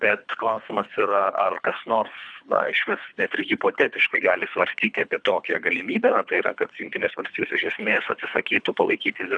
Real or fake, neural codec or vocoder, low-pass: fake; codec, 24 kHz, 3 kbps, HILCodec; 9.9 kHz